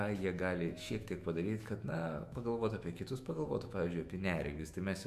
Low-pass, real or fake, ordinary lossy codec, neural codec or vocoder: 14.4 kHz; fake; Opus, 64 kbps; autoencoder, 48 kHz, 128 numbers a frame, DAC-VAE, trained on Japanese speech